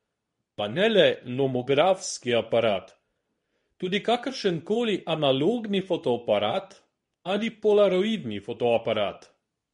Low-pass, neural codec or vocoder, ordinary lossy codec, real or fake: 10.8 kHz; codec, 24 kHz, 0.9 kbps, WavTokenizer, medium speech release version 2; MP3, 48 kbps; fake